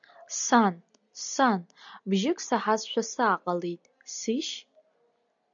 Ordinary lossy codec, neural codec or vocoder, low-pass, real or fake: MP3, 96 kbps; none; 7.2 kHz; real